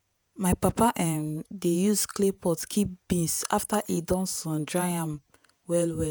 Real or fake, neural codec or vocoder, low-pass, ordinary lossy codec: fake; vocoder, 48 kHz, 128 mel bands, Vocos; none; none